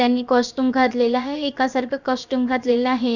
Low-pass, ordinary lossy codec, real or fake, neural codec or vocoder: 7.2 kHz; none; fake; codec, 16 kHz, about 1 kbps, DyCAST, with the encoder's durations